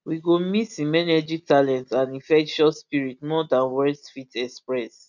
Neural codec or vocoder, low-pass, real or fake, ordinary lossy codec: none; 7.2 kHz; real; none